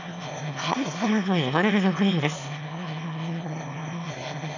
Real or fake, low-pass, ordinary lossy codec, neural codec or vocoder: fake; 7.2 kHz; none; autoencoder, 22.05 kHz, a latent of 192 numbers a frame, VITS, trained on one speaker